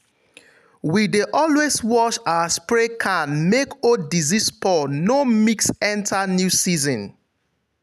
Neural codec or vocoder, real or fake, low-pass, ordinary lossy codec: none; real; 14.4 kHz; none